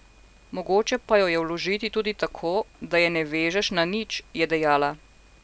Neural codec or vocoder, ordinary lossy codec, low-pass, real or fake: none; none; none; real